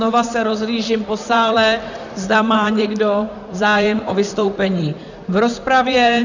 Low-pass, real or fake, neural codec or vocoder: 7.2 kHz; fake; vocoder, 44.1 kHz, 128 mel bands, Pupu-Vocoder